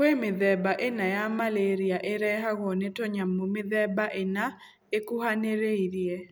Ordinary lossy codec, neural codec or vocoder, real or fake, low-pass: none; none; real; none